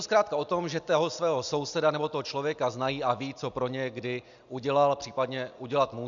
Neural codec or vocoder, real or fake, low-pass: none; real; 7.2 kHz